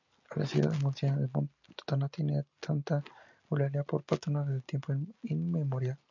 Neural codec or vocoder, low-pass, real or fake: none; 7.2 kHz; real